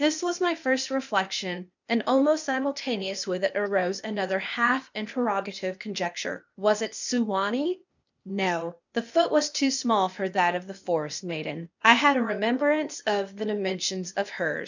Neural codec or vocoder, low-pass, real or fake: codec, 16 kHz, 0.8 kbps, ZipCodec; 7.2 kHz; fake